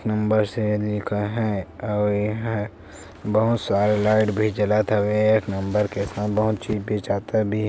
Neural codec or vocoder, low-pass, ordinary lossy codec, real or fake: none; none; none; real